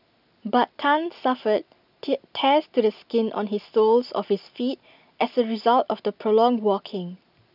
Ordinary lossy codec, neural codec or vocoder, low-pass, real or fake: none; none; 5.4 kHz; real